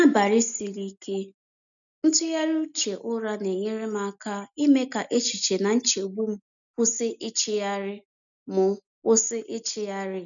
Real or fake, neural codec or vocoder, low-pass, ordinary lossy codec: real; none; 7.2 kHz; none